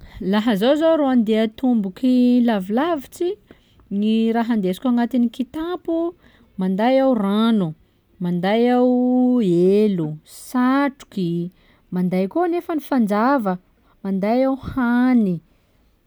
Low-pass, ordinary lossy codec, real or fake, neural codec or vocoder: none; none; real; none